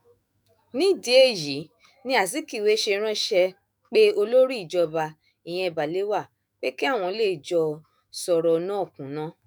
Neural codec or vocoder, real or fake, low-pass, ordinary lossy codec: autoencoder, 48 kHz, 128 numbers a frame, DAC-VAE, trained on Japanese speech; fake; none; none